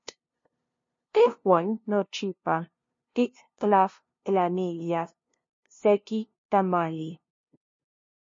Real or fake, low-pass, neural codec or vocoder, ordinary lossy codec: fake; 7.2 kHz; codec, 16 kHz, 0.5 kbps, FunCodec, trained on LibriTTS, 25 frames a second; MP3, 32 kbps